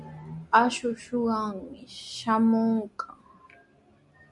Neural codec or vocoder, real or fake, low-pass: none; real; 10.8 kHz